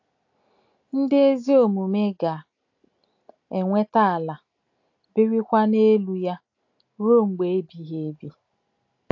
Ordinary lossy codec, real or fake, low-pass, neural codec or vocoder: AAC, 48 kbps; real; 7.2 kHz; none